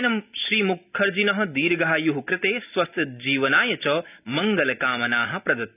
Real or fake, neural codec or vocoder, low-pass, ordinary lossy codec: real; none; 3.6 kHz; none